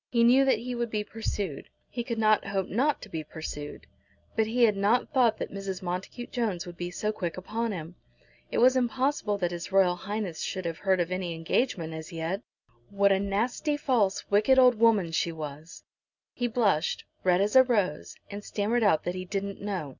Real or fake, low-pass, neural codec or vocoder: real; 7.2 kHz; none